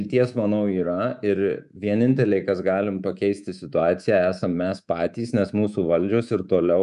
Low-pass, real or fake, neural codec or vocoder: 10.8 kHz; fake; codec, 24 kHz, 3.1 kbps, DualCodec